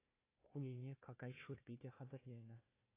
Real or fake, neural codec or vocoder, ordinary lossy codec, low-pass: fake; codec, 16 kHz, 1 kbps, FunCodec, trained on Chinese and English, 50 frames a second; AAC, 16 kbps; 3.6 kHz